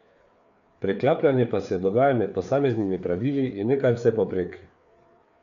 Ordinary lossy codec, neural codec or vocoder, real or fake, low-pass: none; codec, 16 kHz, 4 kbps, FreqCodec, larger model; fake; 7.2 kHz